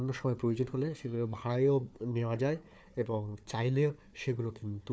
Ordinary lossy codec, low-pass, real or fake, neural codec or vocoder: none; none; fake; codec, 16 kHz, 4 kbps, FunCodec, trained on Chinese and English, 50 frames a second